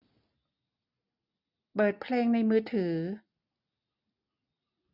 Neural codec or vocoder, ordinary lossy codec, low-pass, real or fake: none; none; 5.4 kHz; real